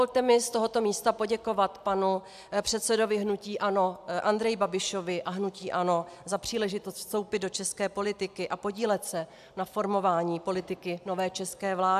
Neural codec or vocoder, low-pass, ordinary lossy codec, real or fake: none; 14.4 kHz; AAC, 96 kbps; real